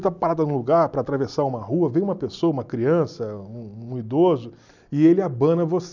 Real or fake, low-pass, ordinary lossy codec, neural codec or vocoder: real; 7.2 kHz; none; none